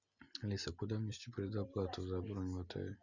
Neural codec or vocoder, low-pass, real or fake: none; 7.2 kHz; real